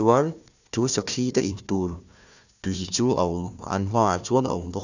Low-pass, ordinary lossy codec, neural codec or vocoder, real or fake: 7.2 kHz; none; codec, 16 kHz, 1 kbps, FunCodec, trained on Chinese and English, 50 frames a second; fake